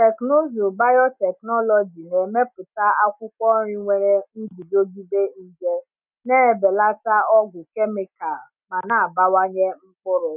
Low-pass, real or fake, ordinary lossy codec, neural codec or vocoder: 3.6 kHz; real; none; none